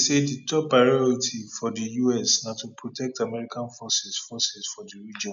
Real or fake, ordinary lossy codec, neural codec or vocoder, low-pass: real; none; none; 7.2 kHz